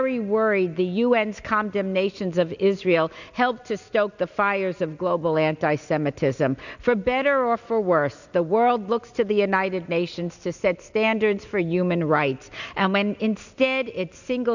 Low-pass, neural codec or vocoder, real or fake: 7.2 kHz; none; real